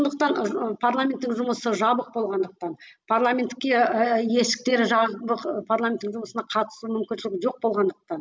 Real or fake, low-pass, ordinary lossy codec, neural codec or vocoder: real; none; none; none